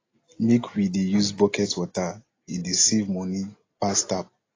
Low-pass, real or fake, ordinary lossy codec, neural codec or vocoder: 7.2 kHz; real; AAC, 32 kbps; none